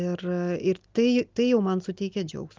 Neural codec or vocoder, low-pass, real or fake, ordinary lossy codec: none; 7.2 kHz; real; Opus, 24 kbps